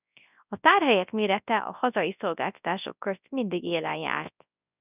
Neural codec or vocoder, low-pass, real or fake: codec, 24 kHz, 0.9 kbps, WavTokenizer, large speech release; 3.6 kHz; fake